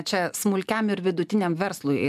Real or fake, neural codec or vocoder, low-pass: real; none; 14.4 kHz